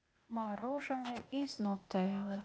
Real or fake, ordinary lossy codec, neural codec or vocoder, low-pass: fake; none; codec, 16 kHz, 0.8 kbps, ZipCodec; none